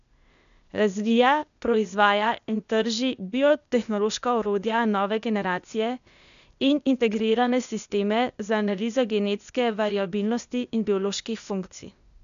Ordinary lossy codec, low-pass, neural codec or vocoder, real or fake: none; 7.2 kHz; codec, 16 kHz, 0.8 kbps, ZipCodec; fake